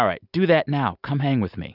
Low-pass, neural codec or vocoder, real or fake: 5.4 kHz; none; real